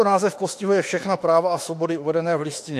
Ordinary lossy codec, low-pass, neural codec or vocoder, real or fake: AAC, 64 kbps; 14.4 kHz; autoencoder, 48 kHz, 32 numbers a frame, DAC-VAE, trained on Japanese speech; fake